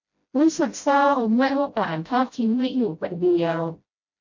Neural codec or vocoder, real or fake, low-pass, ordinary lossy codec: codec, 16 kHz, 0.5 kbps, FreqCodec, smaller model; fake; 7.2 kHz; MP3, 48 kbps